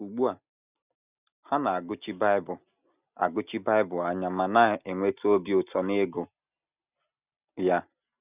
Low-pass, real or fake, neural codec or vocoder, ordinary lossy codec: 3.6 kHz; real; none; none